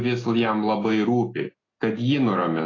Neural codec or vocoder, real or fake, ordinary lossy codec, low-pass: none; real; AAC, 32 kbps; 7.2 kHz